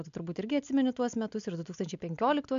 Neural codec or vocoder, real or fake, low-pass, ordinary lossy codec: none; real; 7.2 kHz; MP3, 64 kbps